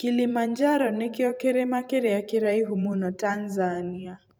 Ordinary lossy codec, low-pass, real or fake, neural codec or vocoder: none; none; fake; vocoder, 44.1 kHz, 128 mel bands every 512 samples, BigVGAN v2